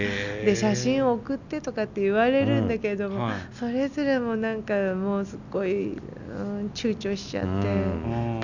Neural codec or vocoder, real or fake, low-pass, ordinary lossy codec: none; real; 7.2 kHz; none